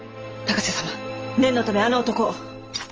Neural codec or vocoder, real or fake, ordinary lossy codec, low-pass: none; real; Opus, 24 kbps; 7.2 kHz